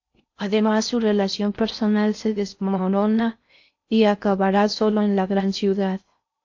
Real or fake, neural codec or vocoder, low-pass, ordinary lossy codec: fake; codec, 16 kHz in and 24 kHz out, 0.6 kbps, FocalCodec, streaming, 4096 codes; 7.2 kHz; AAC, 48 kbps